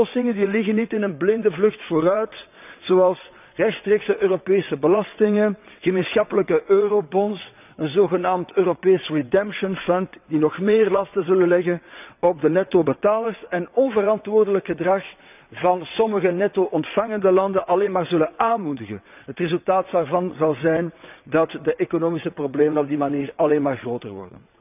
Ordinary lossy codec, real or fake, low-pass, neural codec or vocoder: none; fake; 3.6 kHz; vocoder, 22.05 kHz, 80 mel bands, WaveNeXt